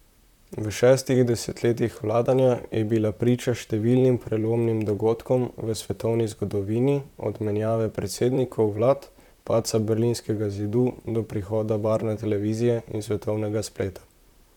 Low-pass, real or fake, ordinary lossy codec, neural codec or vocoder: 19.8 kHz; fake; none; vocoder, 44.1 kHz, 128 mel bands, Pupu-Vocoder